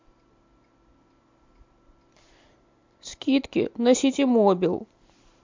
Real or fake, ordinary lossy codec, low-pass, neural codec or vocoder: real; MP3, 64 kbps; 7.2 kHz; none